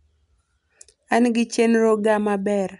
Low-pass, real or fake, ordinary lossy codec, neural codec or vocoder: 10.8 kHz; real; none; none